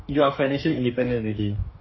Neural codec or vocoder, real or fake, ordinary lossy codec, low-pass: codec, 44.1 kHz, 2.6 kbps, DAC; fake; MP3, 24 kbps; 7.2 kHz